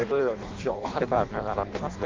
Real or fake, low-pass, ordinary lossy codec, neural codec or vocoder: fake; 7.2 kHz; Opus, 32 kbps; codec, 16 kHz in and 24 kHz out, 0.6 kbps, FireRedTTS-2 codec